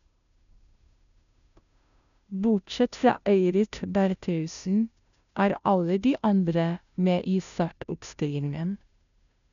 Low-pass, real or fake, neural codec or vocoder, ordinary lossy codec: 7.2 kHz; fake; codec, 16 kHz, 0.5 kbps, FunCodec, trained on Chinese and English, 25 frames a second; none